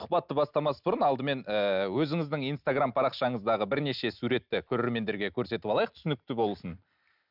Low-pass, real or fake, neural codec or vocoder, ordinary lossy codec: 5.4 kHz; real; none; none